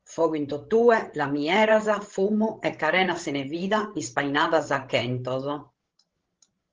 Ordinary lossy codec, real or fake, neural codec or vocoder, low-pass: Opus, 16 kbps; fake; codec, 16 kHz, 16 kbps, FreqCodec, larger model; 7.2 kHz